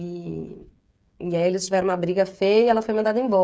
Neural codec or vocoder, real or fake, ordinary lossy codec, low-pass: codec, 16 kHz, 8 kbps, FreqCodec, smaller model; fake; none; none